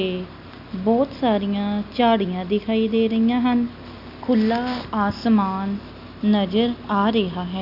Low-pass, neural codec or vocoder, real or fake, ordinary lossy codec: 5.4 kHz; none; real; AAC, 48 kbps